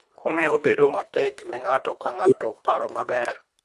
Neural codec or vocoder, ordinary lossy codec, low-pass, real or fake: codec, 24 kHz, 1.5 kbps, HILCodec; none; none; fake